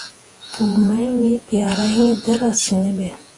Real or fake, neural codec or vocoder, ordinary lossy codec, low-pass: fake; vocoder, 48 kHz, 128 mel bands, Vocos; AAC, 32 kbps; 10.8 kHz